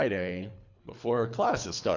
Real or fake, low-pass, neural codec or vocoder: fake; 7.2 kHz; codec, 24 kHz, 3 kbps, HILCodec